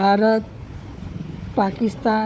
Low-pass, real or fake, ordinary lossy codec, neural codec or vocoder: none; fake; none; codec, 16 kHz, 4 kbps, FunCodec, trained on Chinese and English, 50 frames a second